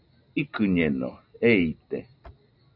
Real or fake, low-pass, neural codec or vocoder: real; 5.4 kHz; none